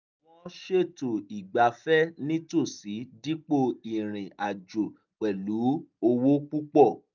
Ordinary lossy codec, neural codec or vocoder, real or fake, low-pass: none; none; real; 7.2 kHz